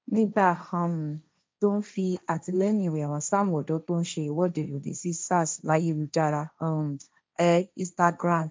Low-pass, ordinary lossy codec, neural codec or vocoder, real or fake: none; none; codec, 16 kHz, 1.1 kbps, Voila-Tokenizer; fake